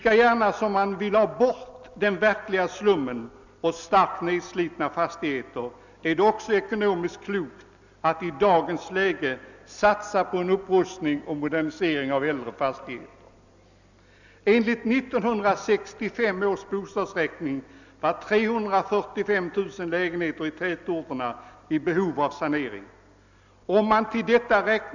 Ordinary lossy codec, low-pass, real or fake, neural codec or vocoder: none; 7.2 kHz; real; none